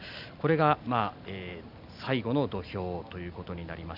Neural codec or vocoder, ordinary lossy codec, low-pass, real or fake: none; AAC, 48 kbps; 5.4 kHz; real